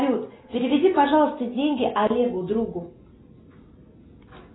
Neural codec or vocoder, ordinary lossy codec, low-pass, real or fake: none; AAC, 16 kbps; 7.2 kHz; real